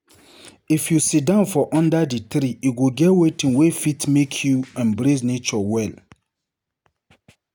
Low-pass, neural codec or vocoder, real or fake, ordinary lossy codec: none; none; real; none